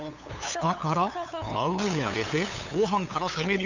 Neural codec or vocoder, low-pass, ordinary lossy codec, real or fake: codec, 16 kHz, 8 kbps, FunCodec, trained on LibriTTS, 25 frames a second; 7.2 kHz; none; fake